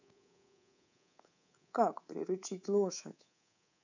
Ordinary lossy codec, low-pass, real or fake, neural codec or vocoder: none; 7.2 kHz; fake; codec, 24 kHz, 3.1 kbps, DualCodec